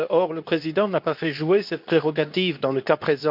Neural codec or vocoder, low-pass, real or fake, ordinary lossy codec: codec, 24 kHz, 0.9 kbps, WavTokenizer, medium speech release version 2; 5.4 kHz; fake; none